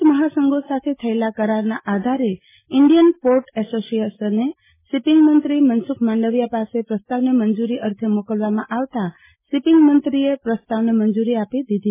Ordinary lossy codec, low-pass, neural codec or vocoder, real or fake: MP3, 16 kbps; 3.6 kHz; none; real